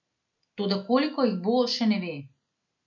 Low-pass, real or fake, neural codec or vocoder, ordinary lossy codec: 7.2 kHz; real; none; MP3, 64 kbps